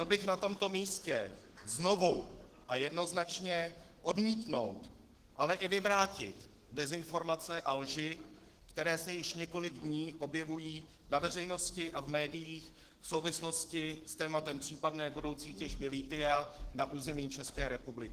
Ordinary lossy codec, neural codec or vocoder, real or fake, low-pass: Opus, 16 kbps; codec, 32 kHz, 1.9 kbps, SNAC; fake; 14.4 kHz